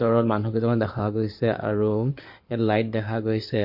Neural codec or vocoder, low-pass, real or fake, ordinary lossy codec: codec, 16 kHz in and 24 kHz out, 2.2 kbps, FireRedTTS-2 codec; 5.4 kHz; fake; MP3, 32 kbps